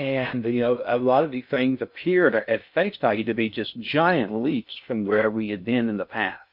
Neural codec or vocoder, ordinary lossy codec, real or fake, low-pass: codec, 16 kHz in and 24 kHz out, 0.6 kbps, FocalCodec, streaming, 2048 codes; MP3, 48 kbps; fake; 5.4 kHz